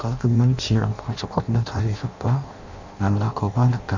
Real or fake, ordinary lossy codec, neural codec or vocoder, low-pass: fake; none; codec, 16 kHz in and 24 kHz out, 0.6 kbps, FireRedTTS-2 codec; 7.2 kHz